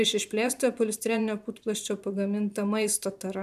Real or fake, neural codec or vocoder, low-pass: fake; vocoder, 44.1 kHz, 128 mel bands every 512 samples, BigVGAN v2; 14.4 kHz